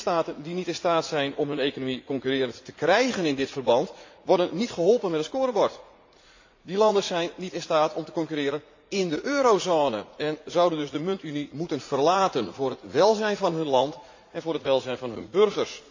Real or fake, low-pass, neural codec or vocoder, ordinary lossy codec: fake; 7.2 kHz; vocoder, 44.1 kHz, 80 mel bands, Vocos; AAC, 48 kbps